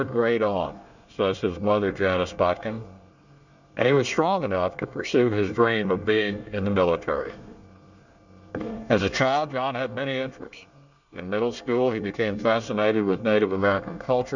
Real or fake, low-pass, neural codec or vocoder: fake; 7.2 kHz; codec, 24 kHz, 1 kbps, SNAC